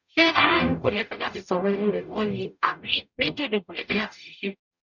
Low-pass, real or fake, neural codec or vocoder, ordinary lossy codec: 7.2 kHz; fake; codec, 44.1 kHz, 0.9 kbps, DAC; none